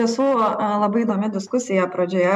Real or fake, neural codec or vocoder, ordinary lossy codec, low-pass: real; none; MP3, 96 kbps; 14.4 kHz